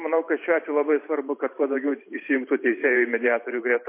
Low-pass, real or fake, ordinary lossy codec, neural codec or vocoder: 3.6 kHz; fake; MP3, 24 kbps; vocoder, 44.1 kHz, 128 mel bands every 512 samples, BigVGAN v2